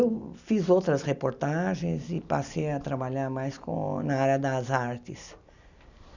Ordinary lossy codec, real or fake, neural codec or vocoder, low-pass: none; real; none; 7.2 kHz